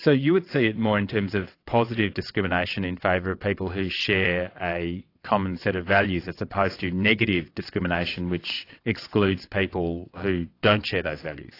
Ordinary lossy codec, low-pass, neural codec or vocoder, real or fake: AAC, 32 kbps; 5.4 kHz; vocoder, 22.05 kHz, 80 mel bands, WaveNeXt; fake